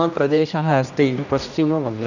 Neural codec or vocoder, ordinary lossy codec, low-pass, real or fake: codec, 16 kHz, 1 kbps, X-Codec, HuBERT features, trained on general audio; none; 7.2 kHz; fake